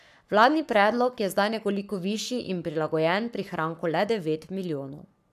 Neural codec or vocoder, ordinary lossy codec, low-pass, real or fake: codec, 44.1 kHz, 7.8 kbps, Pupu-Codec; none; 14.4 kHz; fake